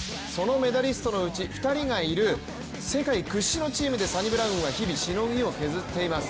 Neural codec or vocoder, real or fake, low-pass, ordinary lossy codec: none; real; none; none